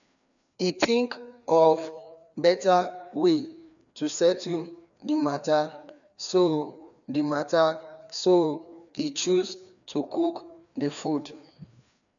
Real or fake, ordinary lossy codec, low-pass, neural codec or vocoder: fake; none; 7.2 kHz; codec, 16 kHz, 2 kbps, FreqCodec, larger model